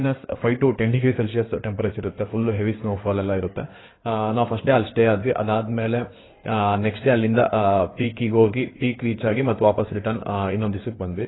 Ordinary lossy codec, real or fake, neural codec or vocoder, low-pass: AAC, 16 kbps; fake; codec, 16 kHz in and 24 kHz out, 2.2 kbps, FireRedTTS-2 codec; 7.2 kHz